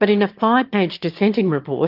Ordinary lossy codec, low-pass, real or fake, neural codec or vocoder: Opus, 32 kbps; 5.4 kHz; fake; autoencoder, 22.05 kHz, a latent of 192 numbers a frame, VITS, trained on one speaker